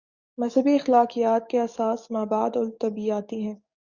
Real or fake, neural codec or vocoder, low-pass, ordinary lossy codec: fake; codec, 44.1 kHz, 7.8 kbps, DAC; 7.2 kHz; Opus, 64 kbps